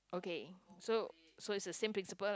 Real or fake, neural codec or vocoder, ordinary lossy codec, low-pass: real; none; none; none